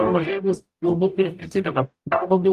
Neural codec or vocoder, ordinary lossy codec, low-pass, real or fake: codec, 44.1 kHz, 0.9 kbps, DAC; Opus, 32 kbps; 14.4 kHz; fake